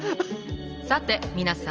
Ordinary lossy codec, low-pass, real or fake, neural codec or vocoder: Opus, 24 kbps; 7.2 kHz; real; none